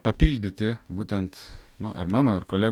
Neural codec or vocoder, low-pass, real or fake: codec, 44.1 kHz, 2.6 kbps, DAC; 19.8 kHz; fake